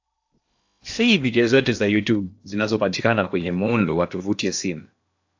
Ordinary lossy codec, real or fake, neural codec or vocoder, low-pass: AAC, 48 kbps; fake; codec, 16 kHz in and 24 kHz out, 0.8 kbps, FocalCodec, streaming, 65536 codes; 7.2 kHz